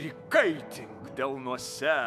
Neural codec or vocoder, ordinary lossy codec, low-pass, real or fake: autoencoder, 48 kHz, 128 numbers a frame, DAC-VAE, trained on Japanese speech; MP3, 96 kbps; 14.4 kHz; fake